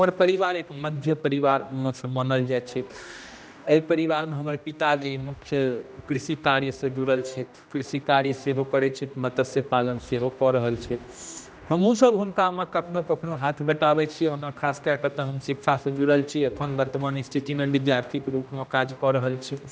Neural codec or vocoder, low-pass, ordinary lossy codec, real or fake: codec, 16 kHz, 1 kbps, X-Codec, HuBERT features, trained on general audio; none; none; fake